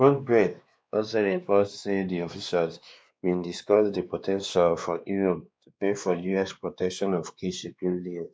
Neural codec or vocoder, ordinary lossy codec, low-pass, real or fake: codec, 16 kHz, 2 kbps, X-Codec, WavLM features, trained on Multilingual LibriSpeech; none; none; fake